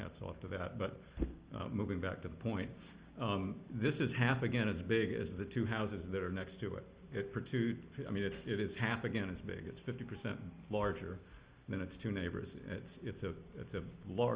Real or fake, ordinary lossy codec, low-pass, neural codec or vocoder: real; Opus, 32 kbps; 3.6 kHz; none